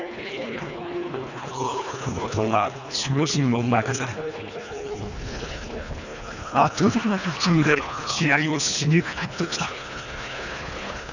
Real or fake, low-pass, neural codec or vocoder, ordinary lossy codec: fake; 7.2 kHz; codec, 24 kHz, 1.5 kbps, HILCodec; none